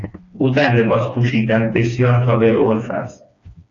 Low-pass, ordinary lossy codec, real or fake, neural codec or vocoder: 7.2 kHz; AAC, 48 kbps; fake; codec, 16 kHz, 2 kbps, FreqCodec, smaller model